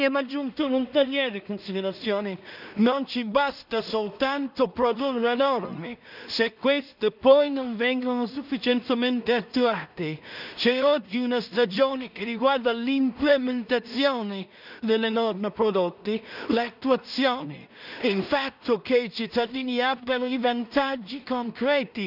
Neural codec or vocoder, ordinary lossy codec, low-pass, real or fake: codec, 16 kHz in and 24 kHz out, 0.4 kbps, LongCat-Audio-Codec, two codebook decoder; none; 5.4 kHz; fake